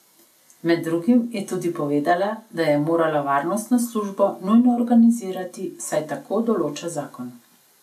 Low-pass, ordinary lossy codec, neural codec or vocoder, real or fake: 14.4 kHz; none; none; real